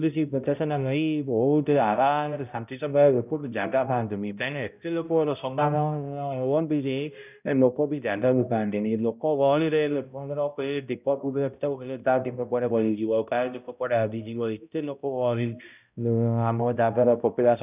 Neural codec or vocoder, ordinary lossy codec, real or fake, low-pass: codec, 16 kHz, 0.5 kbps, X-Codec, HuBERT features, trained on balanced general audio; none; fake; 3.6 kHz